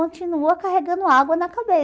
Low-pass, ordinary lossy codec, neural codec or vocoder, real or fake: none; none; none; real